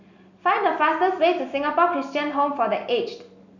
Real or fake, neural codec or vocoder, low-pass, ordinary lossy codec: real; none; 7.2 kHz; none